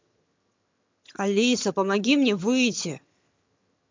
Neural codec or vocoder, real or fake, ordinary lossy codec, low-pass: vocoder, 22.05 kHz, 80 mel bands, HiFi-GAN; fake; MP3, 64 kbps; 7.2 kHz